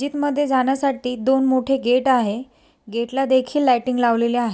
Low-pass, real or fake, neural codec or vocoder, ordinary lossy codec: none; real; none; none